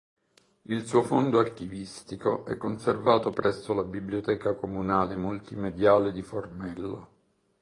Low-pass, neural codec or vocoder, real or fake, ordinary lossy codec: 10.8 kHz; vocoder, 24 kHz, 100 mel bands, Vocos; fake; AAC, 32 kbps